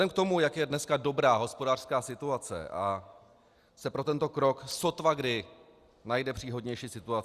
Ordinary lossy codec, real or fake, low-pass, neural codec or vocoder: Opus, 64 kbps; real; 14.4 kHz; none